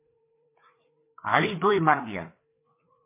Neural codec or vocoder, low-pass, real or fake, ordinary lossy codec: codec, 16 kHz, 4 kbps, FreqCodec, larger model; 3.6 kHz; fake; MP3, 24 kbps